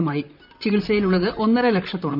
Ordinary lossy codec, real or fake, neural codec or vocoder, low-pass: none; fake; vocoder, 44.1 kHz, 128 mel bands, Pupu-Vocoder; 5.4 kHz